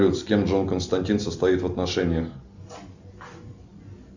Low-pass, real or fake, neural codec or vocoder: 7.2 kHz; real; none